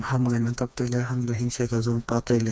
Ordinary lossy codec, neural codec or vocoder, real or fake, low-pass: none; codec, 16 kHz, 2 kbps, FreqCodec, smaller model; fake; none